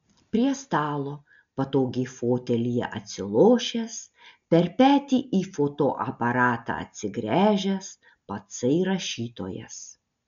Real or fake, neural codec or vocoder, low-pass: real; none; 7.2 kHz